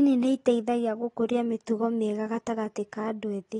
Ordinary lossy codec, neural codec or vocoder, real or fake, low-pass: AAC, 32 kbps; none; real; 10.8 kHz